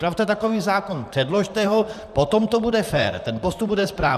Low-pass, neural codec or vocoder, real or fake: 14.4 kHz; vocoder, 44.1 kHz, 128 mel bands, Pupu-Vocoder; fake